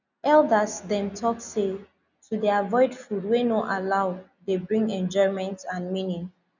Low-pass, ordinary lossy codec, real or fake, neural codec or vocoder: 7.2 kHz; none; real; none